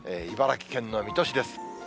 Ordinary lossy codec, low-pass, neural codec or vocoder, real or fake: none; none; none; real